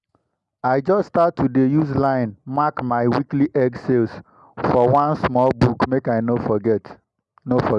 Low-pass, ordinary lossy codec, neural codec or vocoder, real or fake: 10.8 kHz; none; none; real